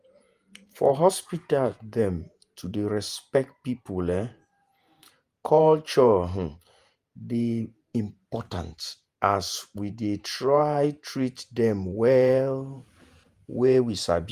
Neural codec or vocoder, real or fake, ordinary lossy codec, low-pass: vocoder, 48 kHz, 128 mel bands, Vocos; fake; Opus, 32 kbps; 14.4 kHz